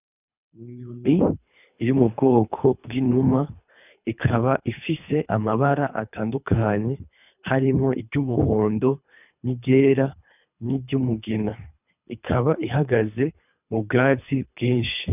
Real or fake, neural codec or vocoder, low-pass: fake; codec, 24 kHz, 3 kbps, HILCodec; 3.6 kHz